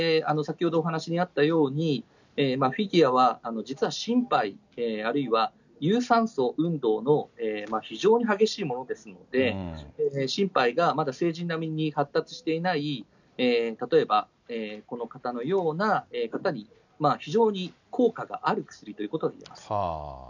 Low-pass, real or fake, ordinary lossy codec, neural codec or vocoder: 7.2 kHz; real; none; none